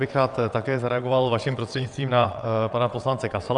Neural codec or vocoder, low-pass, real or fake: vocoder, 22.05 kHz, 80 mel bands, WaveNeXt; 9.9 kHz; fake